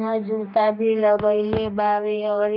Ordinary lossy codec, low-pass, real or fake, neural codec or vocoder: none; 5.4 kHz; fake; codec, 16 kHz, 2 kbps, X-Codec, HuBERT features, trained on general audio